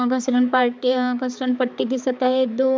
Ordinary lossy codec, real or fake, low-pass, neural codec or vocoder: none; fake; none; codec, 16 kHz, 4 kbps, X-Codec, HuBERT features, trained on balanced general audio